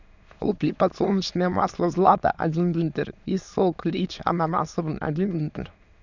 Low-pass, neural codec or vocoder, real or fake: 7.2 kHz; autoencoder, 22.05 kHz, a latent of 192 numbers a frame, VITS, trained on many speakers; fake